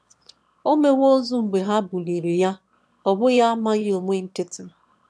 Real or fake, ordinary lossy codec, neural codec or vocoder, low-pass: fake; none; autoencoder, 22.05 kHz, a latent of 192 numbers a frame, VITS, trained on one speaker; none